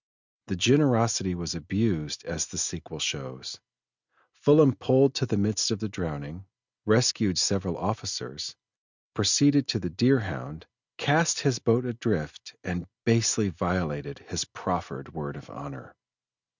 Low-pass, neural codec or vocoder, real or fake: 7.2 kHz; none; real